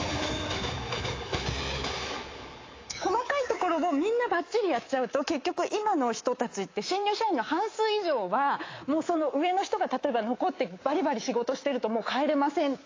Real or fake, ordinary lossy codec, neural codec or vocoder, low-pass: fake; AAC, 32 kbps; codec, 24 kHz, 3.1 kbps, DualCodec; 7.2 kHz